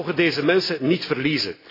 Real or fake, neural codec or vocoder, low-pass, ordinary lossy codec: real; none; 5.4 kHz; AAC, 24 kbps